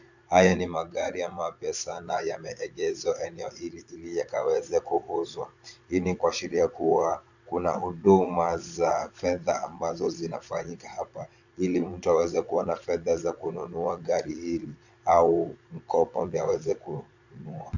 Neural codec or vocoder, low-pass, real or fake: vocoder, 22.05 kHz, 80 mel bands, Vocos; 7.2 kHz; fake